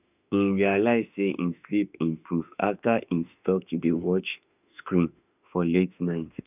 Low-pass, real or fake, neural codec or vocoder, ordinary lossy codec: 3.6 kHz; fake; autoencoder, 48 kHz, 32 numbers a frame, DAC-VAE, trained on Japanese speech; none